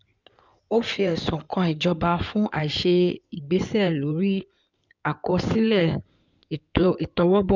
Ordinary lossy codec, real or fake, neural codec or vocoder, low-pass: none; fake; codec, 16 kHz in and 24 kHz out, 2.2 kbps, FireRedTTS-2 codec; 7.2 kHz